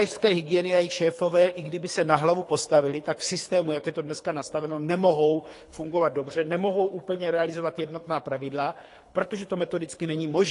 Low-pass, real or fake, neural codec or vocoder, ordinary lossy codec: 10.8 kHz; fake; codec, 24 kHz, 3 kbps, HILCodec; AAC, 48 kbps